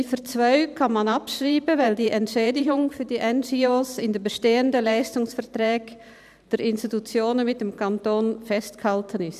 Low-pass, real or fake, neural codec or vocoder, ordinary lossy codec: 14.4 kHz; fake; vocoder, 44.1 kHz, 128 mel bands every 512 samples, BigVGAN v2; none